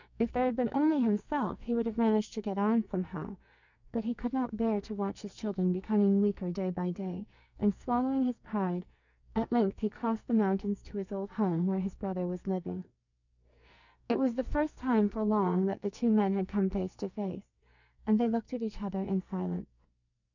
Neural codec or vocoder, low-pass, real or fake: codec, 44.1 kHz, 2.6 kbps, SNAC; 7.2 kHz; fake